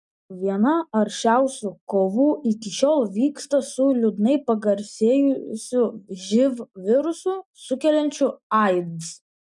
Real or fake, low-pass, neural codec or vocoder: real; 10.8 kHz; none